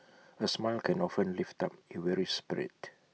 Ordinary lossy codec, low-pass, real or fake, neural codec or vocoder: none; none; real; none